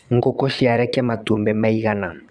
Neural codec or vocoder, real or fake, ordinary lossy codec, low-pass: vocoder, 22.05 kHz, 80 mel bands, Vocos; fake; none; 9.9 kHz